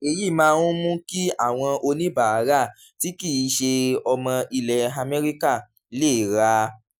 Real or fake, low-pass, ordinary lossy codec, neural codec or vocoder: real; none; none; none